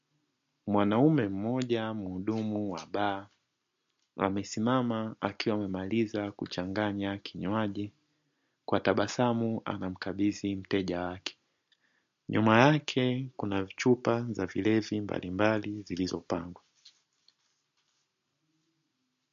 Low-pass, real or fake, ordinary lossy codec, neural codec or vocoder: 7.2 kHz; real; MP3, 48 kbps; none